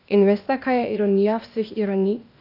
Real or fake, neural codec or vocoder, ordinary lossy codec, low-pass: fake; codec, 16 kHz, 0.8 kbps, ZipCodec; none; 5.4 kHz